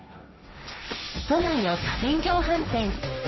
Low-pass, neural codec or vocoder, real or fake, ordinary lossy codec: 7.2 kHz; codec, 16 kHz, 1.1 kbps, Voila-Tokenizer; fake; MP3, 24 kbps